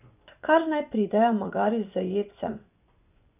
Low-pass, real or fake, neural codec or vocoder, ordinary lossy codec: 3.6 kHz; fake; vocoder, 24 kHz, 100 mel bands, Vocos; none